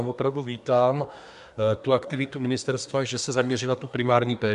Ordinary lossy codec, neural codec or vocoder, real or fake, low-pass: AAC, 96 kbps; codec, 24 kHz, 1 kbps, SNAC; fake; 10.8 kHz